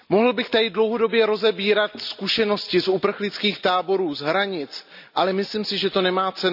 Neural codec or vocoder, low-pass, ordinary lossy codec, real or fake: none; 5.4 kHz; none; real